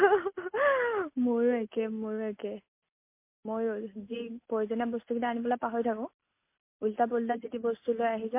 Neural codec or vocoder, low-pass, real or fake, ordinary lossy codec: none; 3.6 kHz; real; MP3, 24 kbps